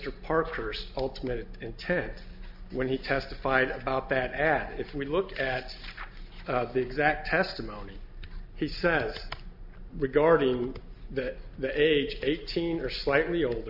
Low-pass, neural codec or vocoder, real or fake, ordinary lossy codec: 5.4 kHz; none; real; MP3, 48 kbps